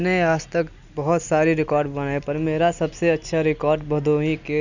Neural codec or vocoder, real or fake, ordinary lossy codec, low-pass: none; real; none; 7.2 kHz